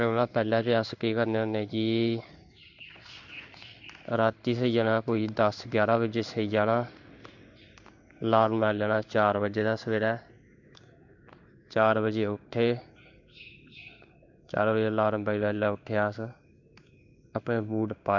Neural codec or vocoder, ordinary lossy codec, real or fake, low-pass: codec, 16 kHz in and 24 kHz out, 1 kbps, XY-Tokenizer; none; fake; 7.2 kHz